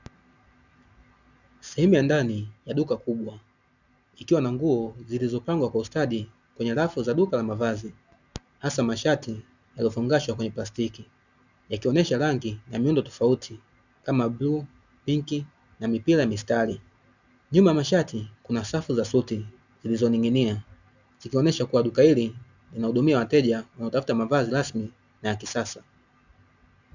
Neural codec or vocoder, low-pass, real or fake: none; 7.2 kHz; real